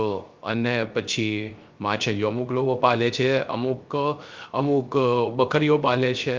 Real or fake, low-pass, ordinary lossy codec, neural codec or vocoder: fake; 7.2 kHz; Opus, 32 kbps; codec, 16 kHz, 0.3 kbps, FocalCodec